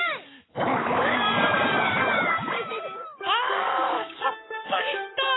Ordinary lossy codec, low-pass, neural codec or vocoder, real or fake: AAC, 16 kbps; 7.2 kHz; codec, 44.1 kHz, 7.8 kbps, Pupu-Codec; fake